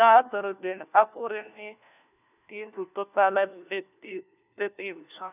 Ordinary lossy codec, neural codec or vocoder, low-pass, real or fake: none; codec, 16 kHz, 1 kbps, FunCodec, trained on LibriTTS, 50 frames a second; 3.6 kHz; fake